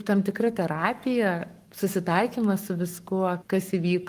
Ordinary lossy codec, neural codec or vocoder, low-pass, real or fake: Opus, 32 kbps; codec, 44.1 kHz, 7.8 kbps, Pupu-Codec; 14.4 kHz; fake